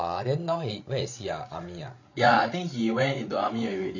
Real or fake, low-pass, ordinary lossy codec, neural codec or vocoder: fake; 7.2 kHz; none; codec, 16 kHz, 16 kbps, FreqCodec, larger model